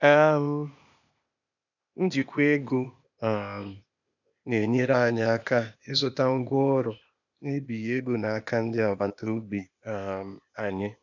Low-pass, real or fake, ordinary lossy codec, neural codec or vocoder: 7.2 kHz; fake; none; codec, 16 kHz, 0.8 kbps, ZipCodec